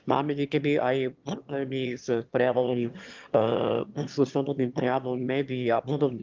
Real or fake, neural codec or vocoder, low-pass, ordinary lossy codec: fake; autoencoder, 22.05 kHz, a latent of 192 numbers a frame, VITS, trained on one speaker; 7.2 kHz; Opus, 24 kbps